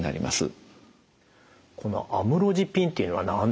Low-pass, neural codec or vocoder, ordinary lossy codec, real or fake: none; none; none; real